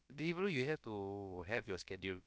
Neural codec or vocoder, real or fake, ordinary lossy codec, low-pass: codec, 16 kHz, about 1 kbps, DyCAST, with the encoder's durations; fake; none; none